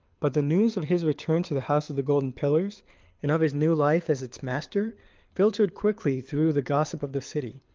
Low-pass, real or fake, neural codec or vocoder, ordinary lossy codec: 7.2 kHz; fake; codec, 24 kHz, 6 kbps, HILCodec; Opus, 24 kbps